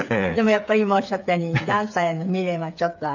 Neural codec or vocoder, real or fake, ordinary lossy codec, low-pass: codec, 16 kHz, 8 kbps, FreqCodec, smaller model; fake; none; 7.2 kHz